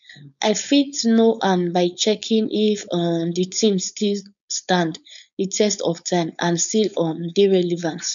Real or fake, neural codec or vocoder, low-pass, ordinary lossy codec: fake; codec, 16 kHz, 4.8 kbps, FACodec; 7.2 kHz; none